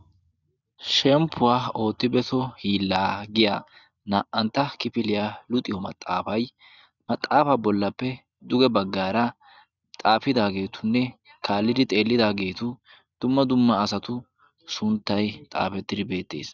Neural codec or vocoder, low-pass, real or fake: none; 7.2 kHz; real